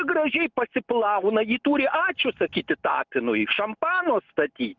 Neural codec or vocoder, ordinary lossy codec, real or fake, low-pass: none; Opus, 32 kbps; real; 7.2 kHz